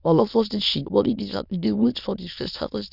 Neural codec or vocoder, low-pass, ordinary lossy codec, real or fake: autoencoder, 22.05 kHz, a latent of 192 numbers a frame, VITS, trained on many speakers; 5.4 kHz; none; fake